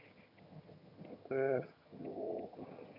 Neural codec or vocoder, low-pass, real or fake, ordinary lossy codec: vocoder, 22.05 kHz, 80 mel bands, HiFi-GAN; 5.4 kHz; fake; none